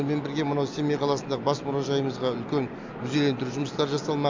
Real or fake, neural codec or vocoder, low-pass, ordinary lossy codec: real; none; 7.2 kHz; MP3, 64 kbps